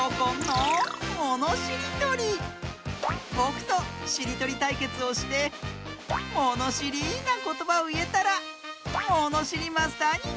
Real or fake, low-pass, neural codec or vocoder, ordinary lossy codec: real; none; none; none